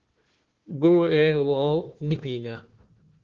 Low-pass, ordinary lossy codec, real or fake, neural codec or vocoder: 7.2 kHz; Opus, 16 kbps; fake; codec, 16 kHz, 1 kbps, FunCodec, trained on Chinese and English, 50 frames a second